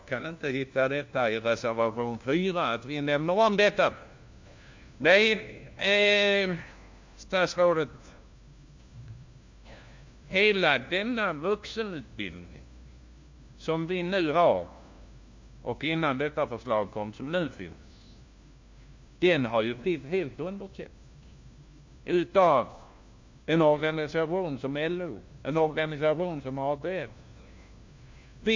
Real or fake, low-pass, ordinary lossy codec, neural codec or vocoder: fake; 7.2 kHz; MP3, 48 kbps; codec, 16 kHz, 1 kbps, FunCodec, trained on LibriTTS, 50 frames a second